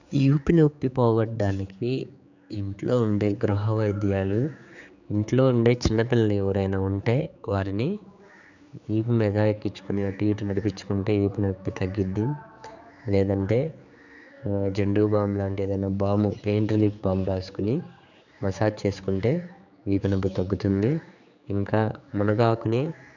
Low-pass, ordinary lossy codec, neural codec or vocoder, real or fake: 7.2 kHz; none; codec, 16 kHz, 4 kbps, X-Codec, HuBERT features, trained on general audio; fake